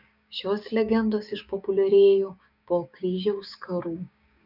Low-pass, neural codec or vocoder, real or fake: 5.4 kHz; codec, 16 kHz, 6 kbps, DAC; fake